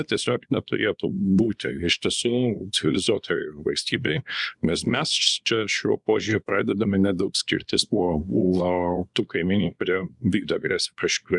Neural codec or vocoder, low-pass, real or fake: codec, 24 kHz, 0.9 kbps, WavTokenizer, small release; 10.8 kHz; fake